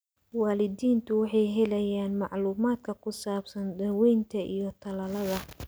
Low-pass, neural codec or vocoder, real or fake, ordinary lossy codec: none; none; real; none